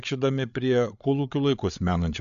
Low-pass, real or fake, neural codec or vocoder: 7.2 kHz; fake; codec, 16 kHz, 8 kbps, FreqCodec, larger model